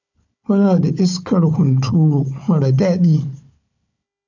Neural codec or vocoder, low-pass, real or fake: codec, 16 kHz, 4 kbps, FunCodec, trained on Chinese and English, 50 frames a second; 7.2 kHz; fake